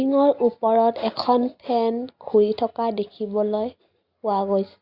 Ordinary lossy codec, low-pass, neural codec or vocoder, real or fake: Opus, 64 kbps; 5.4 kHz; none; real